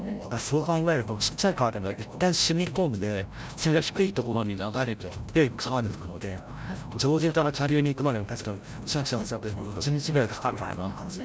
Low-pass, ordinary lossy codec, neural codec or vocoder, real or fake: none; none; codec, 16 kHz, 0.5 kbps, FreqCodec, larger model; fake